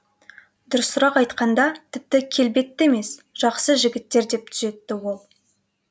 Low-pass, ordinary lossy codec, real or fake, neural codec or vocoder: none; none; real; none